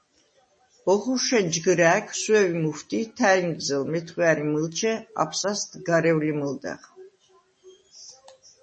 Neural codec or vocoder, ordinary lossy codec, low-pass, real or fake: none; MP3, 32 kbps; 10.8 kHz; real